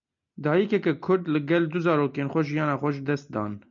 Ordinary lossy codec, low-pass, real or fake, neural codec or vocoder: MP3, 64 kbps; 7.2 kHz; real; none